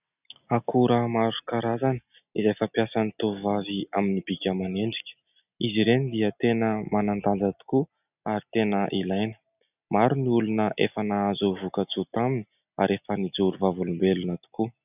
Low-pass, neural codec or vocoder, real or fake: 3.6 kHz; none; real